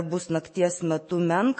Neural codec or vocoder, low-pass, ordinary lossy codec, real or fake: autoencoder, 48 kHz, 128 numbers a frame, DAC-VAE, trained on Japanese speech; 9.9 kHz; MP3, 32 kbps; fake